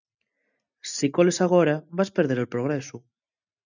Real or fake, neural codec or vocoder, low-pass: real; none; 7.2 kHz